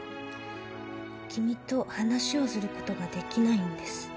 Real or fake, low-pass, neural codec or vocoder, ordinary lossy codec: real; none; none; none